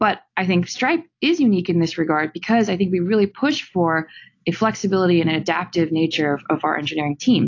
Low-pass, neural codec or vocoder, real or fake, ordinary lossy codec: 7.2 kHz; none; real; AAC, 48 kbps